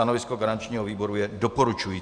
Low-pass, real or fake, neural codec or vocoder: 9.9 kHz; fake; vocoder, 44.1 kHz, 128 mel bands every 512 samples, BigVGAN v2